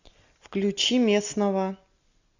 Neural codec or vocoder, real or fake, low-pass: none; real; 7.2 kHz